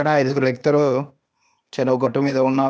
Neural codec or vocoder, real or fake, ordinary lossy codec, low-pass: codec, 16 kHz, 0.8 kbps, ZipCodec; fake; none; none